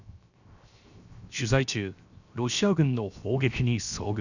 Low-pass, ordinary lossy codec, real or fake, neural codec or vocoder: 7.2 kHz; none; fake; codec, 16 kHz, 0.7 kbps, FocalCodec